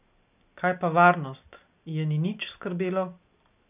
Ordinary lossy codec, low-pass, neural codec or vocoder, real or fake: none; 3.6 kHz; none; real